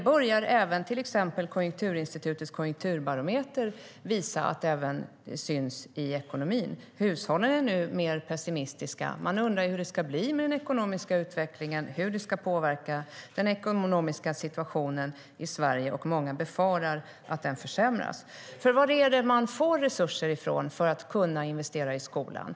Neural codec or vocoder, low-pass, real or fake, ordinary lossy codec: none; none; real; none